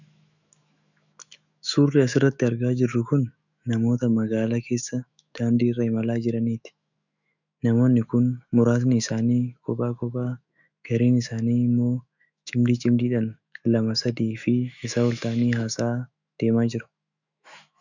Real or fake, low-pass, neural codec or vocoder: fake; 7.2 kHz; autoencoder, 48 kHz, 128 numbers a frame, DAC-VAE, trained on Japanese speech